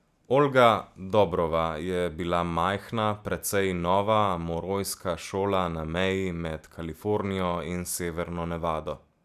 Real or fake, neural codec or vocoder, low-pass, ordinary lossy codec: real; none; 14.4 kHz; none